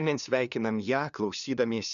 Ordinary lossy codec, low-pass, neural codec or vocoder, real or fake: MP3, 96 kbps; 7.2 kHz; codec, 16 kHz, 2 kbps, FunCodec, trained on LibriTTS, 25 frames a second; fake